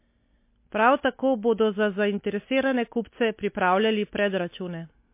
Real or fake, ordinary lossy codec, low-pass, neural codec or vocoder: real; MP3, 24 kbps; 3.6 kHz; none